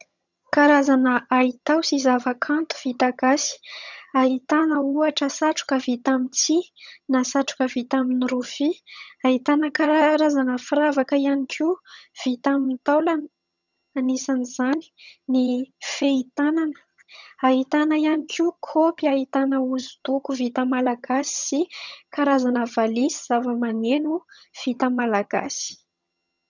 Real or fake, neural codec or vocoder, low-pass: fake; vocoder, 22.05 kHz, 80 mel bands, HiFi-GAN; 7.2 kHz